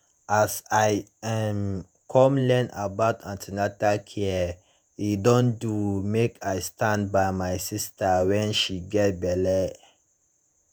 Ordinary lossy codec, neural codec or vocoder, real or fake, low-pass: none; vocoder, 48 kHz, 128 mel bands, Vocos; fake; none